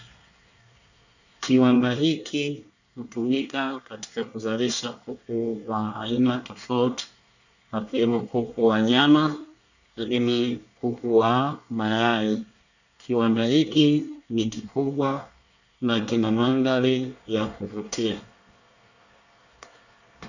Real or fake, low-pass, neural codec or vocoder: fake; 7.2 kHz; codec, 24 kHz, 1 kbps, SNAC